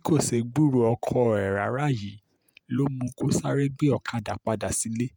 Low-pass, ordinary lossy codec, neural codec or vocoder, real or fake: none; none; vocoder, 48 kHz, 128 mel bands, Vocos; fake